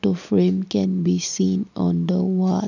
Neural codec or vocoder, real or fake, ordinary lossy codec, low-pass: none; real; none; 7.2 kHz